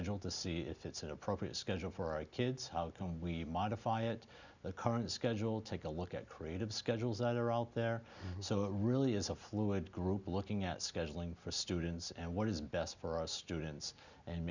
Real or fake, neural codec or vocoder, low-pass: real; none; 7.2 kHz